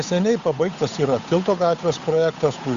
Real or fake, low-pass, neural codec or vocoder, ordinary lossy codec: fake; 7.2 kHz; codec, 16 kHz, 8 kbps, FunCodec, trained on Chinese and English, 25 frames a second; Opus, 64 kbps